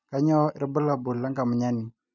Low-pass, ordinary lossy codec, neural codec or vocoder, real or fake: 7.2 kHz; none; none; real